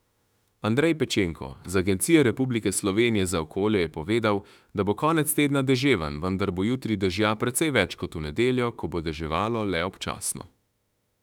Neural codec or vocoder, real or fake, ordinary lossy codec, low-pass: autoencoder, 48 kHz, 32 numbers a frame, DAC-VAE, trained on Japanese speech; fake; none; 19.8 kHz